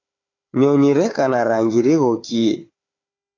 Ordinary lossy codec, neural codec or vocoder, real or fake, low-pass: AAC, 32 kbps; codec, 16 kHz, 4 kbps, FunCodec, trained on Chinese and English, 50 frames a second; fake; 7.2 kHz